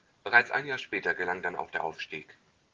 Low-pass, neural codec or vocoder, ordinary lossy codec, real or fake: 7.2 kHz; none; Opus, 16 kbps; real